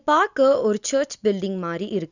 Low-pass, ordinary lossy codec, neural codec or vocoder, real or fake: 7.2 kHz; none; none; real